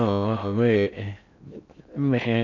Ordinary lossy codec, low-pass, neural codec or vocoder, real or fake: none; 7.2 kHz; codec, 16 kHz in and 24 kHz out, 0.6 kbps, FocalCodec, streaming, 4096 codes; fake